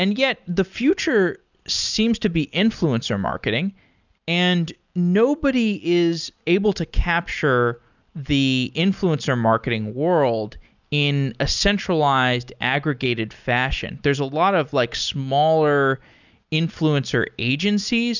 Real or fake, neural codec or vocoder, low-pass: real; none; 7.2 kHz